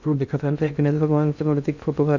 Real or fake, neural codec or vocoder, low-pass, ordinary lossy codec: fake; codec, 16 kHz in and 24 kHz out, 0.6 kbps, FocalCodec, streaming, 2048 codes; 7.2 kHz; none